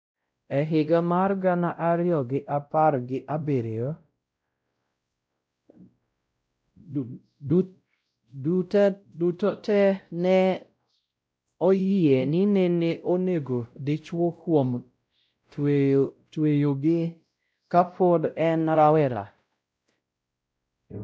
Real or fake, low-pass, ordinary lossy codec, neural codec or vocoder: fake; none; none; codec, 16 kHz, 0.5 kbps, X-Codec, WavLM features, trained on Multilingual LibriSpeech